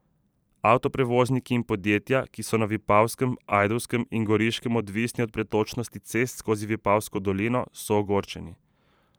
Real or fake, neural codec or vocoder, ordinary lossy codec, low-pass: fake; vocoder, 44.1 kHz, 128 mel bands every 512 samples, BigVGAN v2; none; none